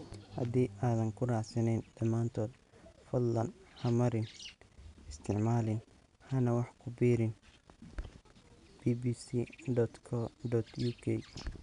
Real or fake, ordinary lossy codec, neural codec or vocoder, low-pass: real; none; none; 10.8 kHz